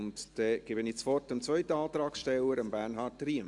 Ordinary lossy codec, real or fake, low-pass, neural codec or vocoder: AAC, 64 kbps; real; 10.8 kHz; none